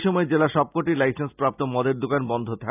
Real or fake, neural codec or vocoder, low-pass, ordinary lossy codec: real; none; 3.6 kHz; none